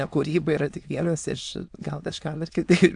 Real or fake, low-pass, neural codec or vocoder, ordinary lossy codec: fake; 9.9 kHz; autoencoder, 22.05 kHz, a latent of 192 numbers a frame, VITS, trained on many speakers; AAC, 64 kbps